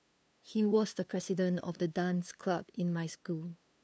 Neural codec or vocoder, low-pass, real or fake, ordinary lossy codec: codec, 16 kHz, 2 kbps, FunCodec, trained on LibriTTS, 25 frames a second; none; fake; none